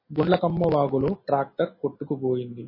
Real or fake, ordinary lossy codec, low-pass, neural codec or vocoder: real; MP3, 24 kbps; 5.4 kHz; none